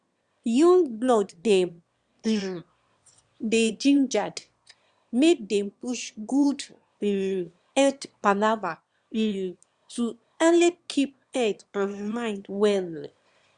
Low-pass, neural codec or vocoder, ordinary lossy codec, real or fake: 9.9 kHz; autoencoder, 22.05 kHz, a latent of 192 numbers a frame, VITS, trained on one speaker; Opus, 64 kbps; fake